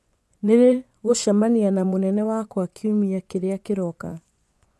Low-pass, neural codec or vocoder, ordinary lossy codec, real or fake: none; vocoder, 24 kHz, 100 mel bands, Vocos; none; fake